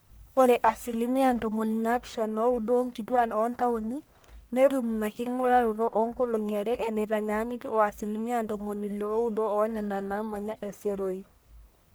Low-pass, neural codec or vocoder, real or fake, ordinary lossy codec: none; codec, 44.1 kHz, 1.7 kbps, Pupu-Codec; fake; none